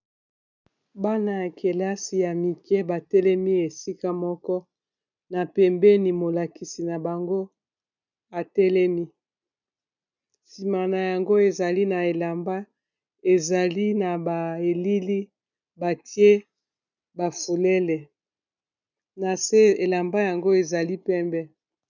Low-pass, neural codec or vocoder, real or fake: 7.2 kHz; none; real